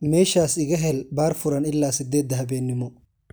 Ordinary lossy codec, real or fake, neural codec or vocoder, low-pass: none; real; none; none